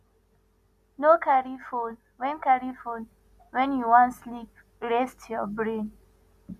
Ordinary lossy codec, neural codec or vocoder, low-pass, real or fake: none; none; 14.4 kHz; real